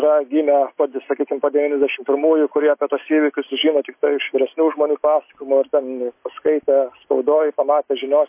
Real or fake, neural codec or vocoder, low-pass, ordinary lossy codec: real; none; 3.6 kHz; MP3, 24 kbps